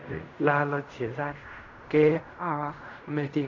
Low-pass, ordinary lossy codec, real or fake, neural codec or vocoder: 7.2 kHz; MP3, 64 kbps; fake; codec, 16 kHz in and 24 kHz out, 0.4 kbps, LongCat-Audio-Codec, fine tuned four codebook decoder